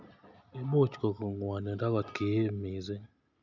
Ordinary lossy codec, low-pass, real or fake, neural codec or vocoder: none; 7.2 kHz; real; none